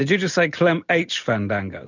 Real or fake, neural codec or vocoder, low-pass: real; none; 7.2 kHz